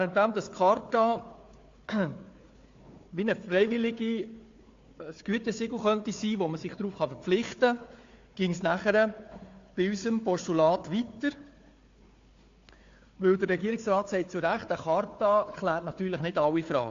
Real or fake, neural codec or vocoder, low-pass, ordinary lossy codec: fake; codec, 16 kHz, 4 kbps, FunCodec, trained on Chinese and English, 50 frames a second; 7.2 kHz; AAC, 48 kbps